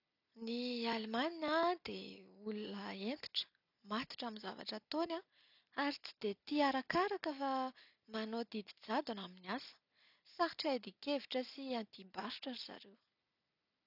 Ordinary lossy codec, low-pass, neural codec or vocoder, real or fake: none; 5.4 kHz; none; real